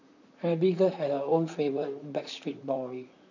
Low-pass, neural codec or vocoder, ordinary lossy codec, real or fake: 7.2 kHz; vocoder, 44.1 kHz, 128 mel bands, Pupu-Vocoder; none; fake